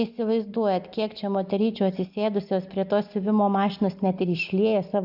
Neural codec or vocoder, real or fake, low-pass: none; real; 5.4 kHz